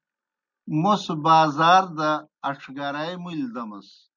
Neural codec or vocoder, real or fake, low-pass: none; real; 7.2 kHz